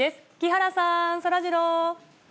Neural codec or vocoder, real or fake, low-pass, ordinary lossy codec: none; real; none; none